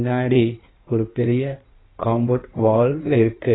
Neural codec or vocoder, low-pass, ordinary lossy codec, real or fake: codec, 16 kHz in and 24 kHz out, 1.1 kbps, FireRedTTS-2 codec; 7.2 kHz; AAC, 16 kbps; fake